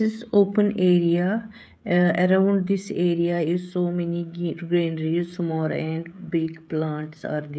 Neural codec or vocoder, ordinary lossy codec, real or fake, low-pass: codec, 16 kHz, 16 kbps, FreqCodec, smaller model; none; fake; none